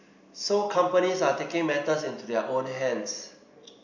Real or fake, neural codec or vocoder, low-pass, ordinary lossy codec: real; none; 7.2 kHz; none